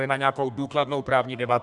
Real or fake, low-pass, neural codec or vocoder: fake; 10.8 kHz; codec, 32 kHz, 1.9 kbps, SNAC